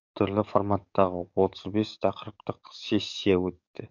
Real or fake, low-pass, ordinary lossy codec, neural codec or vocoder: fake; 7.2 kHz; none; codec, 44.1 kHz, 7.8 kbps, DAC